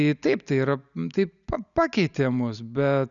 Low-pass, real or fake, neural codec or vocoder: 7.2 kHz; real; none